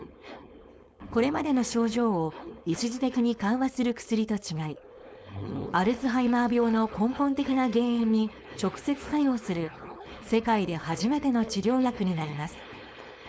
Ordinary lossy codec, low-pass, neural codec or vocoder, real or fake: none; none; codec, 16 kHz, 4.8 kbps, FACodec; fake